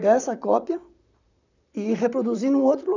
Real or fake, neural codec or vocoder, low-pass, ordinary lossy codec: fake; vocoder, 44.1 kHz, 128 mel bands, Pupu-Vocoder; 7.2 kHz; none